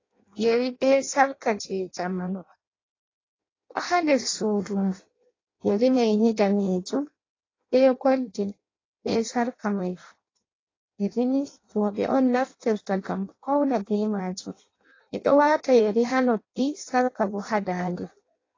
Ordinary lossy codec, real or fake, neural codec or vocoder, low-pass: AAC, 32 kbps; fake; codec, 16 kHz in and 24 kHz out, 0.6 kbps, FireRedTTS-2 codec; 7.2 kHz